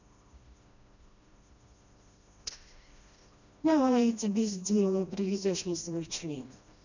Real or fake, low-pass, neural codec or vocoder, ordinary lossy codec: fake; 7.2 kHz; codec, 16 kHz, 1 kbps, FreqCodec, smaller model; none